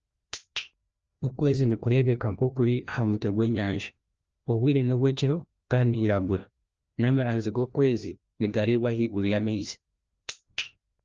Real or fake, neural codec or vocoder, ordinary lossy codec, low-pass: fake; codec, 16 kHz, 1 kbps, FreqCodec, larger model; Opus, 24 kbps; 7.2 kHz